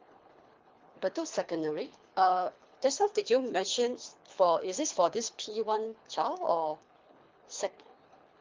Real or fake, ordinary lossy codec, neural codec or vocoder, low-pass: fake; Opus, 24 kbps; codec, 24 kHz, 3 kbps, HILCodec; 7.2 kHz